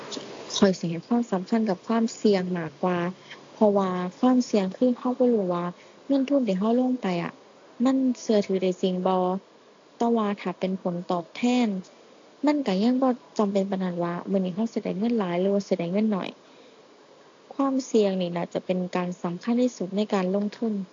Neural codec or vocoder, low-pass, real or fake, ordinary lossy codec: none; 7.2 kHz; real; AAC, 48 kbps